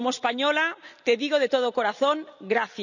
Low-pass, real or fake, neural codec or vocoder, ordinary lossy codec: 7.2 kHz; real; none; none